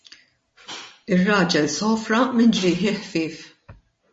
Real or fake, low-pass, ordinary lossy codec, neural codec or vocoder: real; 10.8 kHz; MP3, 32 kbps; none